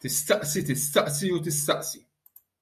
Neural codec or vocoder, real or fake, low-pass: vocoder, 48 kHz, 128 mel bands, Vocos; fake; 14.4 kHz